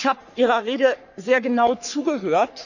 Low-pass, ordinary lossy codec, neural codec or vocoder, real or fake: 7.2 kHz; none; codec, 44.1 kHz, 3.4 kbps, Pupu-Codec; fake